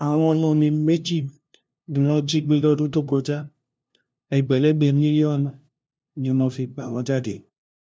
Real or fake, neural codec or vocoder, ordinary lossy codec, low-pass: fake; codec, 16 kHz, 0.5 kbps, FunCodec, trained on LibriTTS, 25 frames a second; none; none